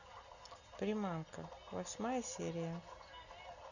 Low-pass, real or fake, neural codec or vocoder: 7.2 kHz; real; none